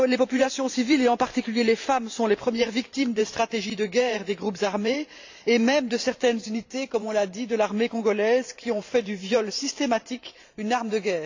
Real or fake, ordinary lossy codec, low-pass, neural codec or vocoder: fake; AAC, 48 kbps; 7.2 kHz; vocoder, 44.1 kHz, 128 mel bands every 512 samples, BigVGAN v2